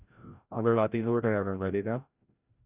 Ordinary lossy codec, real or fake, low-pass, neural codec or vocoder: Opus, 64 kbps; fake; 3.6 kHz; codec, 16 kHz, 0.5 kbps, FreqCodec, larger model